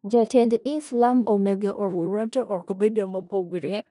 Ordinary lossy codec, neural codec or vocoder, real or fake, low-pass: none; codec, 16 kHz in and 24 kHz out, 0.4 kbps, LongCat-Audio-Codec, four codebook decoder; fake; 10.8 kHz